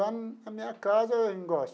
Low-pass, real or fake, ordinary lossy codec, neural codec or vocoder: none; real; none; none